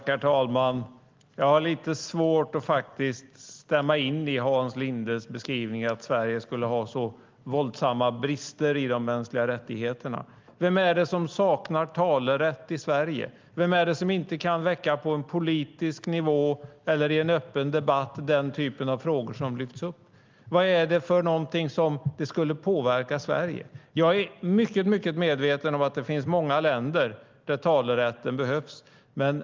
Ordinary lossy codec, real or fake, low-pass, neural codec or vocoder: Opus, 32 kbps; real; 7.2 kHz; none